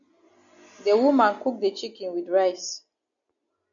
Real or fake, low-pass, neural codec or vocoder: real; 7.2 kHz; none